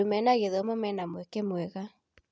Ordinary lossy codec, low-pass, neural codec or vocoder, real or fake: none; none; none; real